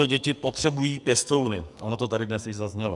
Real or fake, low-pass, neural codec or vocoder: fake; 10.8 kHz; codec, 44.1 kHz, 2.6 kbps, SNAC